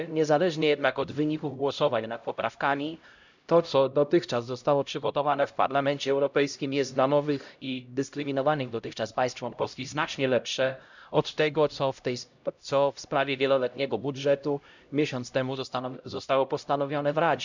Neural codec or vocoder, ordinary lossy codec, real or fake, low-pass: codec, 16 kHz, 0.5 kbps, X-Codec, HuBERT features, trained on LibriSpeech; none; fake; 7.2 kHz